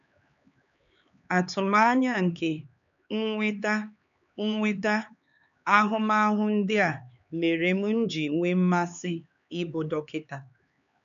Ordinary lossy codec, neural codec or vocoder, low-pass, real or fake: none; codec, 16 kHz, 4 kbps, X-Codec, HuBERT features, trained on LibriSpeech; 7.2 kHz; fake